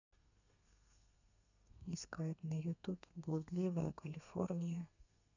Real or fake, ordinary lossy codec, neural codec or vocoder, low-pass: fake; none; codec, 32 kHz, 1.9 kbps, SNAC; 7.2 kHz